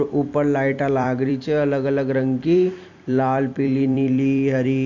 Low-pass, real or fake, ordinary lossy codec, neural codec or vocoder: 7.2 kHz; fake; MP3, 48 kbps; vocoder, 44.1 kHz, 128 mel bands every 256 samples, BigVGAN v2